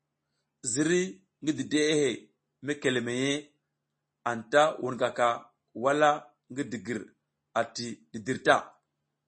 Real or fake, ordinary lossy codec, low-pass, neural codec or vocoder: real; MP3, 32 kbps; 10.8 kHz; none